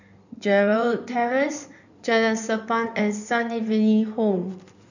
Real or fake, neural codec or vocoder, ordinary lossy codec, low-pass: fake; codec, 16 kHz in and 24 kHz out, 2.2 kbps, FireRedTTS-2 codec; none; 7.2 kHz